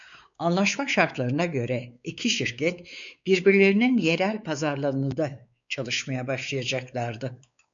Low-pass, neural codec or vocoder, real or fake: 7.2 kHz; codec, 16 kHz, 4 kbps, X-Codec, WavLM features, trained on Multilingual LibriSpeech; fake